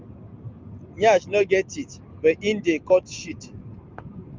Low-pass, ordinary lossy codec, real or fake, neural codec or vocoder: 7.2 kHz; Opus, 32 kbps; real; none